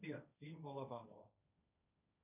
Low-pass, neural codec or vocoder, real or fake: 3.6 kHz; codec, 16 kHz, 1.1 kbps, Voila-Tokenizer; fake